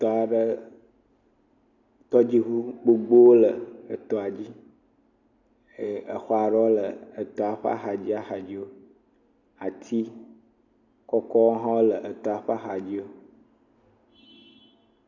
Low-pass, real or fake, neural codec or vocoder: 7.2 kHz; real; none